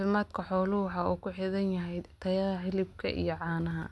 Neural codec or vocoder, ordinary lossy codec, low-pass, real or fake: none; none; none; real